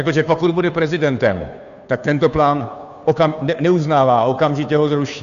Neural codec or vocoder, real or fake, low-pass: codec, 16 kHz, 2 kbps, FunCodec, trained on Chinese and English, 25 frames a second; fake; 7.2 kHz